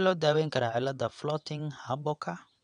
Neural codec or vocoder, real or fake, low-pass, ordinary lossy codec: vocoder, 22.05 kHz, 80 mel bands, WaveNeXt; fake; 9.9 kHz; none